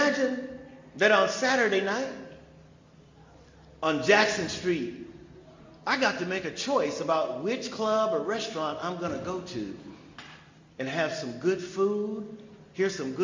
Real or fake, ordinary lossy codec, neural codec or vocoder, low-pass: real; MP3, 64 kbps; none; 7.2 kHz